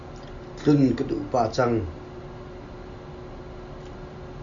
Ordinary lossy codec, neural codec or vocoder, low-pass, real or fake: MP3, 96 kbps; none; 7.2 kHz; real